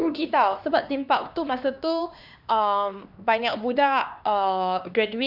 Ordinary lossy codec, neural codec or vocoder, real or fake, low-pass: none; codec, 16 kHz, 2 kbps, X-Codec, HuBERT features, trained on LibriSpeech; fake; 5.4 kHz